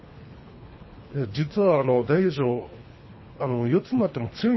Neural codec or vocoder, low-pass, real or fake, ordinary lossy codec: codec, 24 kHz, 3 kbps, HILCodec; 7.2 kHz; fake; MP3, 24 kbps